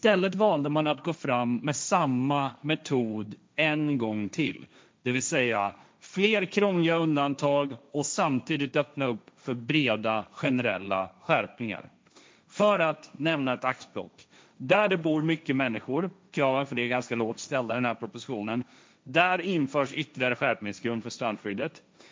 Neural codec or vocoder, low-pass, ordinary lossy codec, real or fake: codec, 16 kHz, 1.1 kbps, Voila-Tokenizer; none; none; fake